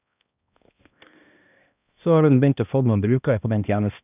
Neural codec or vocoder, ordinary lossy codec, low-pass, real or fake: codec, 16 kHz, 1 kbps, X-Codec, HuBERT features, trained on balanced general audio; none; 3.6 kHz; fake